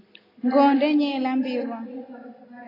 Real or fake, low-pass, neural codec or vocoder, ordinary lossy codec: real; 5.4 kHz; none; AAC, 24 kbps